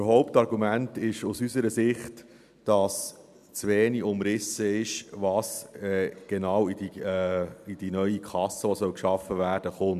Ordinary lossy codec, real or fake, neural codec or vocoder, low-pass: none; real; none; 14.4 kHz